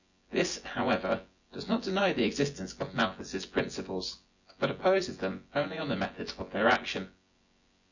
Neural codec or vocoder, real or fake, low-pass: vocoder, 24 kHz, 100 mel bands, Vocos; fake; 7.2 kHz